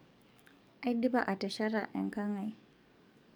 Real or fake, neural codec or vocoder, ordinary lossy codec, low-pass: fake; codec, 44.1 kHz, 7.8 kbps, DAC; none; none